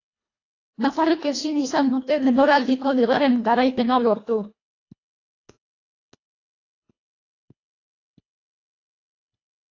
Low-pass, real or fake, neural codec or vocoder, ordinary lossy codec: 7.2 kHz; fake; codec, 24 kHz, 1.5 kbps, HILCodec; AAC, 32 kbps